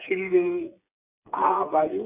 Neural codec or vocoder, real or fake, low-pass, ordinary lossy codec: codec, 16 kHz, 8 kbps, FreqCodec, smaller model; fake; 3.6 kHz; none